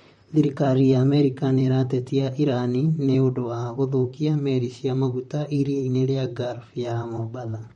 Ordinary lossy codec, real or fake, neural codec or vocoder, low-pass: MP3, 48 kbps; fake; vocoder, 44.1 kHz, 128 mel bands, Pupu-Vocoder; 19.8 kHz